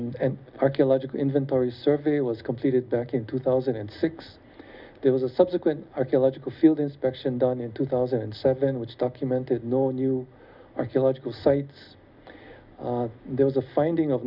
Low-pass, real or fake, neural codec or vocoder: 5.4 kHz; real; none